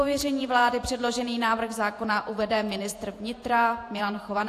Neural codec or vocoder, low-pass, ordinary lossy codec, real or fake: vocoder, 48 kHz, 128 mel bands, Vocos; 14.4 kHz; AAC, 64 kbps; fake